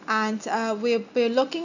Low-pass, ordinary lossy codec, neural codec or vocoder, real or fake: 7.2 kHz; none; none; real